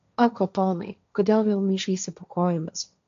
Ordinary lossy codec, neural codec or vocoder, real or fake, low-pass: AAC, 64 kbps; codec, 16 kHz, 1.1 kbps, Voila-Tokenizer; fake; 7.2 kHz